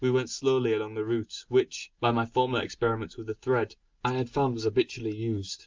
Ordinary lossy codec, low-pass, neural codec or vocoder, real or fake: Opus, 24 kbps; 7.2 kHz; none; real